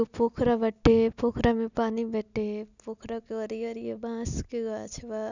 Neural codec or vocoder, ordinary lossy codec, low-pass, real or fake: none; none; 7.2 kHz; real